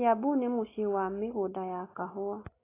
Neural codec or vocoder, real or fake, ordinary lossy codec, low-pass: none; real; AAC, 16 kbps; 3.6 kHz